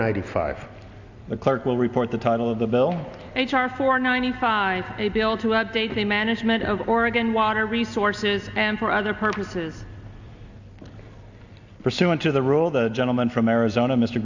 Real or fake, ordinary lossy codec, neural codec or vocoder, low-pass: real; Opus, 64 kbps; none; 7.2 kHz